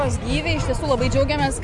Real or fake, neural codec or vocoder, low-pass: real; none; 10.8 kHz